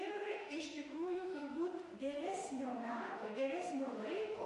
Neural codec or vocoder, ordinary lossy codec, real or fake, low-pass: autoencoder, 48 kHz, 32 numbers a frame, DAC-VAE, trained on Japanese speech; MP3, 48 kbps; fake; 14.4 kHz